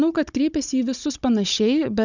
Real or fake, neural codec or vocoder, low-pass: fake; codec, 16 kHz, 16 kbps, FunCodec, trained on Chinese and English, 50 frames a second; 7.2 kHz